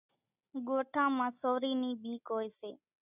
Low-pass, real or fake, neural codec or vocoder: 3.6 kHz; real; none